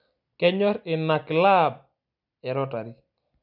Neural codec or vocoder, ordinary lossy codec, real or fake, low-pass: none; none; real; 5.4 kHz